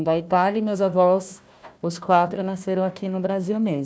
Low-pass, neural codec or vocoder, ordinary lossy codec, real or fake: none; codec, 16 kHz, 1 kbps, FunCodec, trained on Chinese and English, 50 frames a second; none; fake